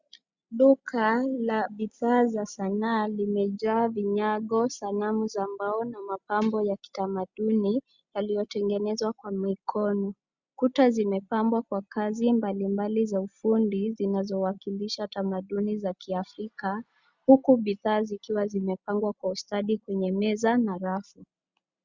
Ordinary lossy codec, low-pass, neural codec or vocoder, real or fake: Opus, 64 kbps; 7.2 kHz; none; real